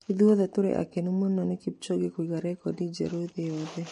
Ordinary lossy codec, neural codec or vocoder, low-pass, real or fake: MP3, 48 kbps; none; 14.4 kHz; real